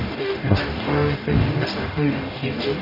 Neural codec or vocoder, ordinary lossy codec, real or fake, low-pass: codec, 44.1 kHz, 0.9 kbps, DAC; none; fake; 5.4 kHz